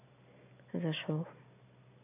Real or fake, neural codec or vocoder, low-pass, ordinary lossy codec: real; none; 3.6 kHz; none